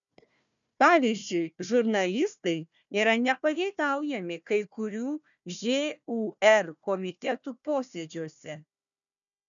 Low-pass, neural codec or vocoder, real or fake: 7.2 kHz; codec, 16 kHz, 1 kbps, FunCodec, trained on Chinese and English, 50 frames a second; fake